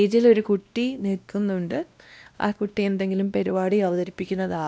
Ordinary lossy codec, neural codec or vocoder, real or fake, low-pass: none; codec, 16 kHz, 1 kbps, X-Codec, WavLM features, trained on Multilingual LibriSpeech; fake; none